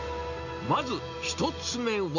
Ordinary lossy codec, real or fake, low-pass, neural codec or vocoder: none; real; 7.2 kHz; none